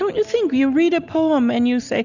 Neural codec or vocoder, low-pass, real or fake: none; 7.2 kHz; real